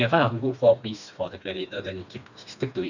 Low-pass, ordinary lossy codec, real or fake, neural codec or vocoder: 7.2 kHz; none; fake; codec, 16 kHz, 2 kbps, FreqCodec, smaller model